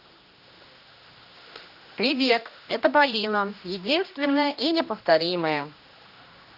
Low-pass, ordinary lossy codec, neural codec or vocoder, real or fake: 5.4 kHz; none; codec, 16 kHz, 1 kbps, X-Codec, HuBERT features, trained on general audio; fake